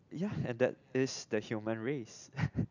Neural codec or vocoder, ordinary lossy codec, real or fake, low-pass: none; none; real; 7.2 kHz